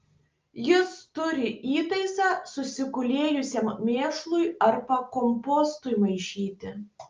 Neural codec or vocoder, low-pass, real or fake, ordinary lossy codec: none; 7.2 kHz; real; Opus, 24 kbps